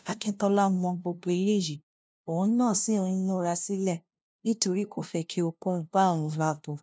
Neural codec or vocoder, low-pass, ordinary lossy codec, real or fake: codec, 16 kHz, 0.5 kbps, FunCodec, trained on LibriTTS, 25 frames a second; none; none; fake